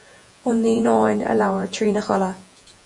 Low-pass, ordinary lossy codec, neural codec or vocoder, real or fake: 10.8 kHz; Opus, 64 kbps; vocoder, 48 kHz, 128 mel bands, Vocos; fake